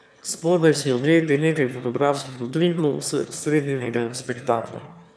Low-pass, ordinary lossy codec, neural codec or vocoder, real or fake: none; none; autoencoder, 22.05 kHz, a latent of 192 numbers a frame, VITS, trained on one speaker; fake